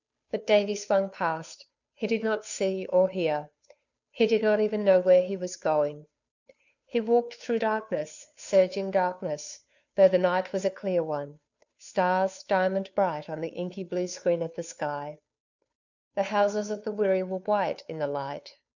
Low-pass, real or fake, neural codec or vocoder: 7.2 kHz; fake; codec, 16 kHz, 2 kbps, FunCodec, trained on Chinese and English, 25 frames a second